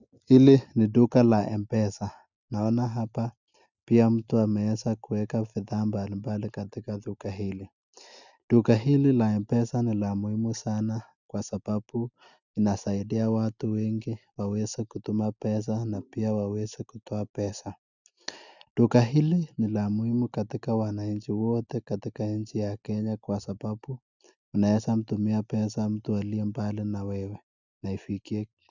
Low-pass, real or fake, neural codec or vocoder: 7.2 kHz; real; none